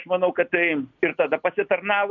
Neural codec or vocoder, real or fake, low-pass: none; real; 7.2 kHz